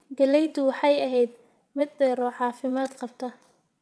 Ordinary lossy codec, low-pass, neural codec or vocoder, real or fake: none; none; vocoder, 22.05 kHz, 80 mel bands, WaveNeXt; fake